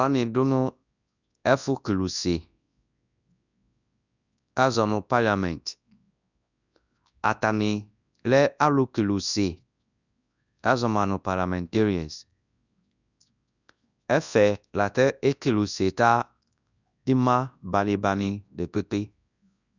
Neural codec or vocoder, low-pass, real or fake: codec, 24 kHz, 0.9 kbps, WavTokenizer, large speech release; 7.2 kHz; fake